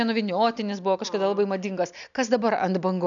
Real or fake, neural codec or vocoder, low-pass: real; none; 7.2 kHz